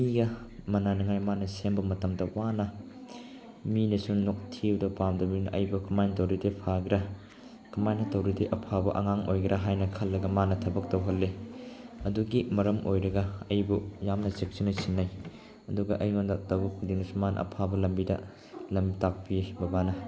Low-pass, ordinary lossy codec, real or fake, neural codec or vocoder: none; none; real; none